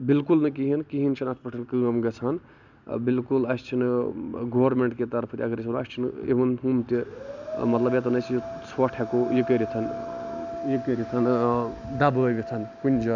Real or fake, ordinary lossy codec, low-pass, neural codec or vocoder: real; none; 7.2 kHz; none